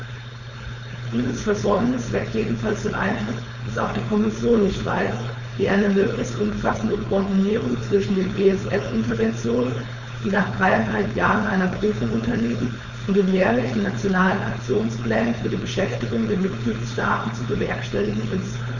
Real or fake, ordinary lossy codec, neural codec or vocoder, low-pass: fake; none; codec, 16 kHz, 4.8 kbps, FACodec; 7.2 kHz